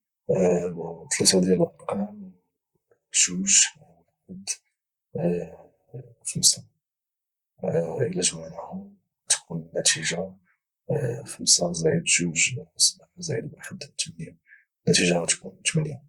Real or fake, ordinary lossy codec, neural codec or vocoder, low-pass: fake; Opus, 64 kbps; vocoder, 44.1 kHz, 128 mel bands, Pupu-Vocoder; 19.8 kHz